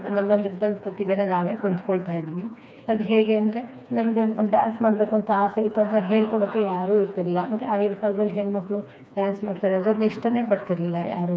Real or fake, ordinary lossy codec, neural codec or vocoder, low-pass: fake; none; codec, 16 kHz, 2 kbps, FreqCodec, smaller model; none